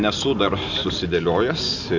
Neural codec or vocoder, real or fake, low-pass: none; real; 7.2 kHz